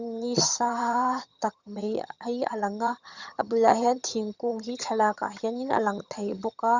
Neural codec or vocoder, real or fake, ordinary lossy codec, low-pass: vocoder, 22.05 kHz, 80 mel bands, HiFi-GAN; fake; Opus, 64 kbps; 7.2 kHz